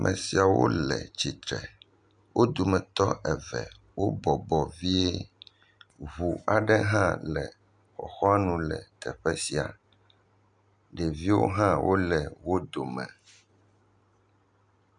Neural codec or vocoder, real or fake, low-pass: none; real; 9.9 kHz